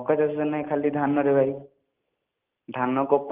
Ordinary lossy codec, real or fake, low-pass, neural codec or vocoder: Opus, 32 kbps; real; 3.6 kHz; none